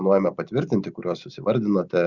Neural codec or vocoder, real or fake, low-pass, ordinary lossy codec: none; real; 7.2 kHz; Opus, 64 kbps